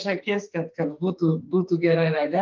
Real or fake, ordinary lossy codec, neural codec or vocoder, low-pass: fake; Opus, 32 kbps; autoencoder, 48 kHz, 32 numbers a frame, DAC-VAE, trained on Japanese speech; 7.2 kHz